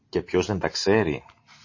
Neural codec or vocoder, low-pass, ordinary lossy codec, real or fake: none; 7.2 kHz; MP3, 32 kbps; real